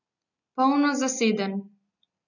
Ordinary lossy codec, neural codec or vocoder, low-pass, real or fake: none; none; 7.2 kHz; real